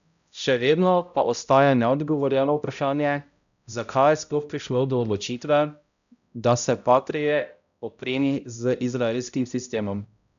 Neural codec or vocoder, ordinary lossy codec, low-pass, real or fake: codec, 16 kHz, 0.5 kbps, X-Codec, HuBERT features, trained on balanced general audio; none; 7.2 kHz; fake